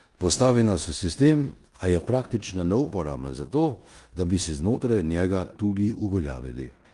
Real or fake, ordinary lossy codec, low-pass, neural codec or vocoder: fake; Opus, 32 kbps; 10.8 kHz; codec, 16 kHz in and 24 kHz out, 0.9 kbps, LongCat-Audio-Codec, four codebook decoder